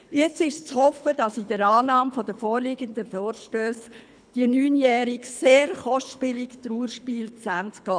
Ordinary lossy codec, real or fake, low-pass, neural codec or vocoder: none; fake; 9.9 kHz; codec, 24 kHz, 3 kbps, HILCodec